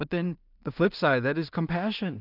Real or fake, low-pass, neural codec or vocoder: fake; 5.4 kHz; codec, 16 kHz in and 24 kHz out, 0.4 kbps, LongCat-Audio-Codec, two codebook decoder